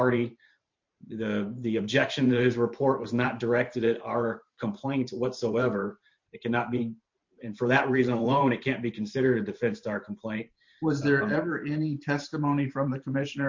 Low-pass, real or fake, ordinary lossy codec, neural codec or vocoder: 7.2 kHz; fake; MP3, 48 kbps; vocoder, 44.1 kHz, 128 mel bands every 512 samples, BigVGAN v2